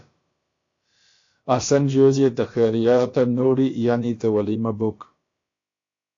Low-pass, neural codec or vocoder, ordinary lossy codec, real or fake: 7.2 kHz; codec, 16 kHz, about 1 kbps, DyCAST, with the encoder's durations; AAC, 48 kbps; fake